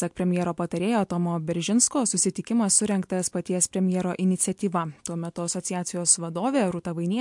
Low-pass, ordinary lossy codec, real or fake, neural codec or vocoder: 10.8 kHz; MP3, 64 kbps; real; none